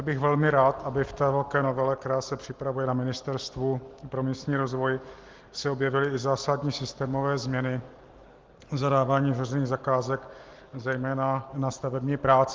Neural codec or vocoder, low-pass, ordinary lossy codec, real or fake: none; 7.2 kHz; Opus, 16 kbps; real